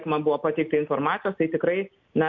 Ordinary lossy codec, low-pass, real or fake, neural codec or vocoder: MP3, 48 kbps; 7.2 kHz; real; none